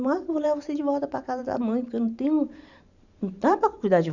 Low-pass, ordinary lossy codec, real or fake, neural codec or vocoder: 7.2 kHz; none; fake; vocoder, 44.1 kHz, 128 mel bands every 512 samples, BigVGAN v2